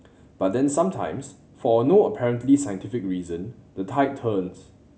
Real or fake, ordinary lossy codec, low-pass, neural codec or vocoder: real; none; none; none